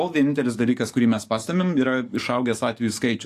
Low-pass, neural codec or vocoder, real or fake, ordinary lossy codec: 14.4 kHz; codec, 44.1 kHz, 7.8 kbps, DAC; fake; AAC, 64 kbps